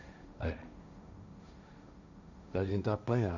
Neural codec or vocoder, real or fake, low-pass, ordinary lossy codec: codec, 16 kHz, 1.1 kbps, Voila-Tokenizer; fake; 7.2 kHz; none